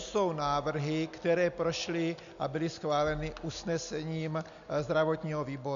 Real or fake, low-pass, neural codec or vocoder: real; 7.2 kHz; none